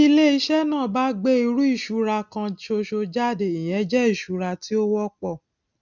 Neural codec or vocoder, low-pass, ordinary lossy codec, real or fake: none; 7.2 kHz; none; real